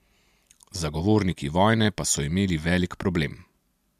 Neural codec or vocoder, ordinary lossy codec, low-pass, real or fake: none; MP3, 96 kbps; 14.4 kHz; real